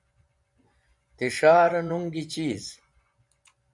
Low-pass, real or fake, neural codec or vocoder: 10.8 kHz; fake; vocoder, 24 kHz, 100 mel bands, Vocos